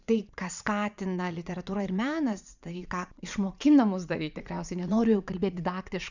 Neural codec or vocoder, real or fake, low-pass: vocoder, 44.1 kHz, 80 mel bands, Vocos; fake; 7.2 kHz